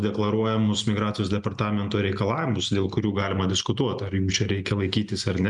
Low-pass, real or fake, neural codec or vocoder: 10.8 kHz; real; none